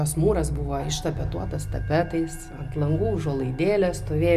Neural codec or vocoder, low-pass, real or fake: none; 14.4 kHz; real